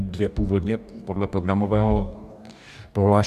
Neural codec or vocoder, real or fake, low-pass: codec, 44.1 kHz, 2.6 kbps, DAC; fake; 14.4 kHz